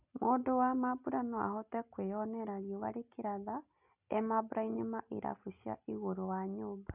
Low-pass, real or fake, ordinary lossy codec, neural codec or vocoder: 3.6 kHz; real; none; none